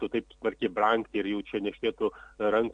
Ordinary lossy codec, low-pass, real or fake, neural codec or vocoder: MP3, 64 kbps; 9.9 kHz; real; none